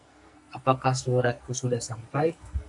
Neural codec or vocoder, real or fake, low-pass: codec, 44.1 kHz, 2.6 kbps, SNAC; fake; 10.8 kHz